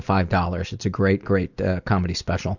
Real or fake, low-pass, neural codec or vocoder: real; 7.2 kHz; none